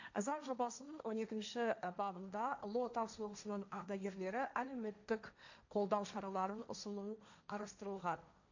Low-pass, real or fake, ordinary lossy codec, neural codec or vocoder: 7.2 kHz; fake; none; codec, 16 kHz, 1.1 kbps, Voila-Tokenizer